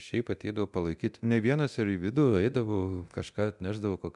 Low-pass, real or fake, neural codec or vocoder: 10.8 kHz; fake; codec, 24 kHz, 0.9 kbps, DualCodec